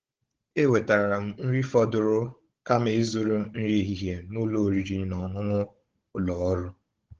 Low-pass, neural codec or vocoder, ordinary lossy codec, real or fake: 7.2 kHz; codec, 16 kHz, 16 kbps, FunCodec, trained on Chinese and English, 50 frames a second; Opus, 16 kbps; fake